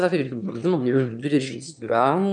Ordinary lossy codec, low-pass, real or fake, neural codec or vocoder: MP3, 96 kbps; 9.9 kHz; fake; autoencoder, 22.05 kHz, a latent of 192 numbers a frame, VITS, trained on one speaker